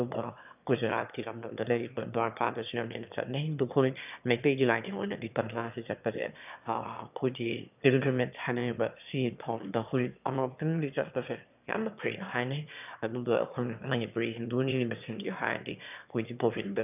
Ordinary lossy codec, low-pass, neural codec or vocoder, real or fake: none; 3.6 kHz; autoencoder, 22.05 kHz, a latent of 192 numbers a frame, VITS, trained on one speaker; fake